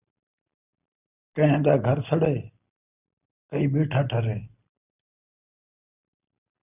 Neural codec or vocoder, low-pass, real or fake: none; 3.6 kHz; real